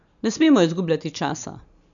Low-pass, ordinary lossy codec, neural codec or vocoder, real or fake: 7.2 kHz; none; none; real